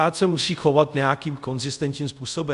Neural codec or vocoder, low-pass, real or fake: codec, 24 kHz, 0.5 kbps, DualCodec; 10.8 kHz; fake